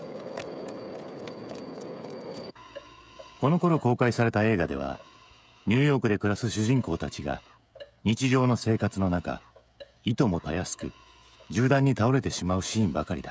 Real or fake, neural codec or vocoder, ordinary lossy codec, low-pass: fake; codec, 16 kHz, 16 kbps, FreqCodec, smaller model; none; none